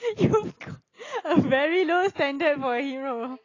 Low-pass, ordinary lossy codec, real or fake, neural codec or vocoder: 7.2 kHz; AAC, 32 kbps; real; none